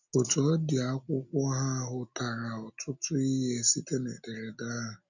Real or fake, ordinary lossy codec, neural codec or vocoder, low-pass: real; none; none; 7.2 kHz